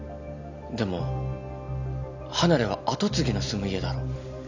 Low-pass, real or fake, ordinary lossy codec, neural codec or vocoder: 7.2 kHz; real; none; none